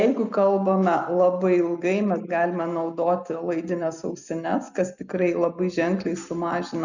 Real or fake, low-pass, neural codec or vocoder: real; 7.2 kHz; none